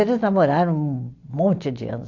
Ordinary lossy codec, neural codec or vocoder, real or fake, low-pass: AAC, 48 kbps; none; real; 7.2 kHz